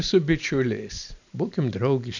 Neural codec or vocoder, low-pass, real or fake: none; 7.2 kHz; real